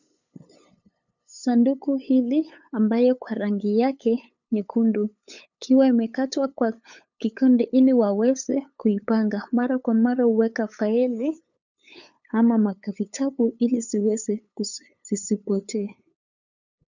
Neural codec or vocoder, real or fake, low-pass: codec, 16 kHz, 8 kbps, FunCodec, trained on LibriTTS, 25 frames a second; fake; 7.2 kHz